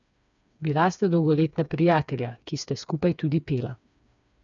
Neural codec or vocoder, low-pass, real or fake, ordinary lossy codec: codec, 16 kHz, 4 kbps, FreqCodec, smaller model; 7.2 kHz; fake; none